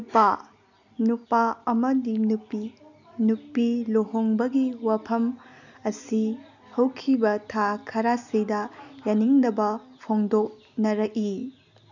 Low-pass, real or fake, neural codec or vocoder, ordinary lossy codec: 7.2 kHz; real; none; none